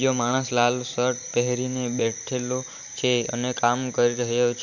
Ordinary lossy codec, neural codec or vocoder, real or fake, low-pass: none; vocoder, 44.1 kHz, 128 mel bands every 512 samples, BigVGAN v2; fake; 7.2 kHz